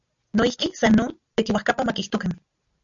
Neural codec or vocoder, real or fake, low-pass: none; real; 7.2 kHz